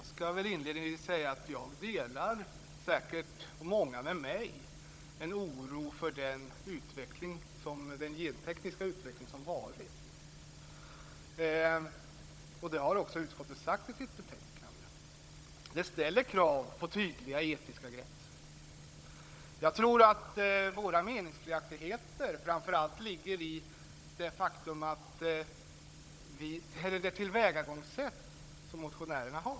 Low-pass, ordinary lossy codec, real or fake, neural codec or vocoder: none; none; fake; codec, 16 kHz, 16 kbps, FunCodec, trained on Chinese and English, 50 frames a second